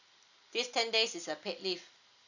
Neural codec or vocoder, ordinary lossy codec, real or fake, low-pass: none; none; real; 7.2 kHz